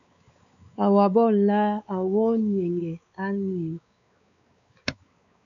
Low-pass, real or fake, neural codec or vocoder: 7.2 kHz; fake; codec, 16 kHz, 4 kbps, X-Codec, WavLM features, trained on Multilingual LibriSpeech